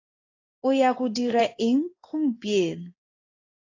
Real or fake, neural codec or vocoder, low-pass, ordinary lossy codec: fake; codec, 16 kHz in and 24 kHz out, 1 kbps, XY-Tokenizer; 7.2 kHz; AAC, 32 kbps